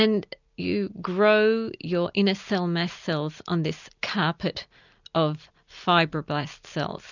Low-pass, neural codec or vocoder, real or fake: 7.2 kHz; none; real